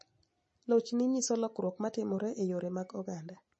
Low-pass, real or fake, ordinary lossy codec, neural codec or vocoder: 10.8 kHz; real; MP3, 32 kbps; none